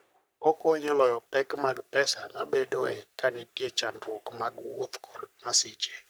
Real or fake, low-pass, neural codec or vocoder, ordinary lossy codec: fake; none; codec, 44.1 kHz, 3.4 kbps, Pupu-Codec; none